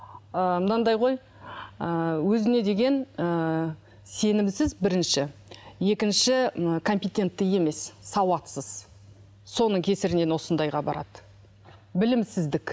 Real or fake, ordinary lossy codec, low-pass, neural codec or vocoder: real; none; none; none